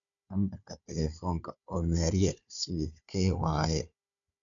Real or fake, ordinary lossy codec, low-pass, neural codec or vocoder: fake; none; 7.2 kHz; codec, 16 kHz, 4 kbps, FunCodec, trained on Chinese and English, 50 frames a second